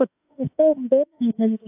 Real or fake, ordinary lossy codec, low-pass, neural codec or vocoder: fake; AAC, 24 kbps; 3.6 kHz; autoencoder, 48 kHz, 32 numbers a frame, DAC-VAE, trained on Japanese speech